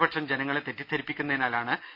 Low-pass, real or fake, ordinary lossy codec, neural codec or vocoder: 5.4 kHz; real; none; none